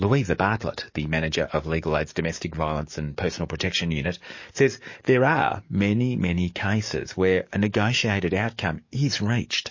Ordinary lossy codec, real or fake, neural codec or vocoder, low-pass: MP3, 32 kbps; fake; codec, 44.1 kHz, 7.8 kbps, DAC; 7.2 kHz